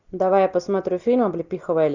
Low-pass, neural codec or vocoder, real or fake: 7.2 kHz; none; real